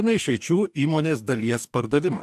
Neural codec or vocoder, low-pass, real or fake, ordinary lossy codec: codec, 44.1 kHz, 2.6 kbps, DAC; 14.4 kHz; fake; AAC, 96 kbps